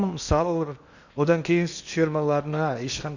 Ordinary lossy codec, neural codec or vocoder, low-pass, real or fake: Opus, 64 kbps; codec, 16 kHz in and 24 kHz out, 0.8 kbps, FocalCodec, streaming, 65536 codes; 7.2 kHz; fake